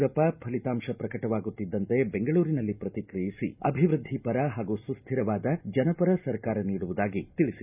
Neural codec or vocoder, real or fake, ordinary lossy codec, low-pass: none; real; none; 3.6 kHz